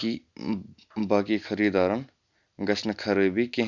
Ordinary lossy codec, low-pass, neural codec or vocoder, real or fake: Opus, 64 kbps; 7.2 kHz; none; real